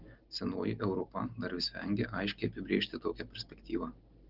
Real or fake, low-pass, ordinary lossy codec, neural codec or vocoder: real; 5.4 kHz; Opus, 16 kbps; none